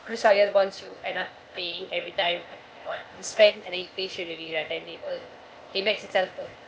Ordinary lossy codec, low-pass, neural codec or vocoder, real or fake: none; none; codec, 16 kHz, 0.8 kbps, ZipCodec; fake